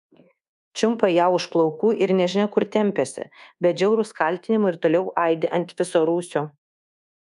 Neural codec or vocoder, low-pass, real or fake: codec, 24 kHz, 1.2 kbps, DualCodec; 10.8 kHz; fake